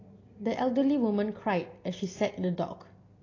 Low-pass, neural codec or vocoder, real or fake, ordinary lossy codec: 7.2 kHz; none; real; AAC, 32 kbps